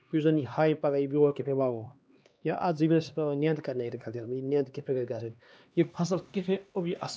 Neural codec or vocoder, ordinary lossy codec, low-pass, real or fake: codec, 16 kHz, 2 kbps, X-Codec, HuBERT features, trained on LibriSpeech; none; none; fake